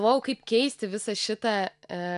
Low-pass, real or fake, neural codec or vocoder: 10.8 kHz; real; none